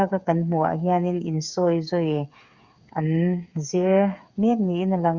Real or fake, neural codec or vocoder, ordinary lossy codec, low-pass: fake; codec, 16 kHz, 8 kbps, FreqCodec, smaller model; Opus, 64 kbps; 7.2 kHz